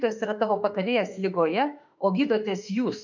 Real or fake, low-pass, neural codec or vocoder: fake; 7.2 kHz; autoencoder, 48 kHz, 32 numbers a frame, DAC-VAE, trained on Japanese speech